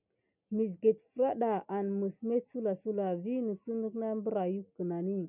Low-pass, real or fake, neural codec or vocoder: 3.6 kHz; real; none